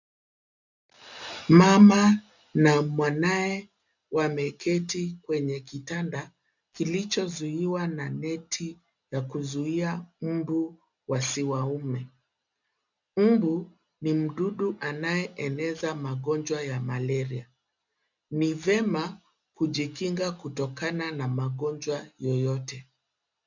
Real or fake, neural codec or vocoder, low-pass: real; none; 7.2 kHz